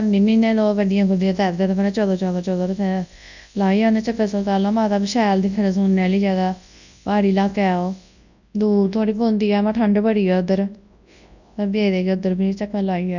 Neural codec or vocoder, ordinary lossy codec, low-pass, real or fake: codec, 24 kHz, 0.9 kbps, WavTokenizer, large speech release; none; 7.2 kHz; fake